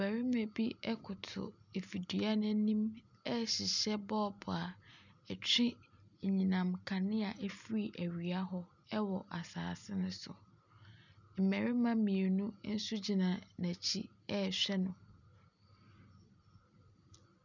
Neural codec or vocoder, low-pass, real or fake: none; 7.2 kHz; real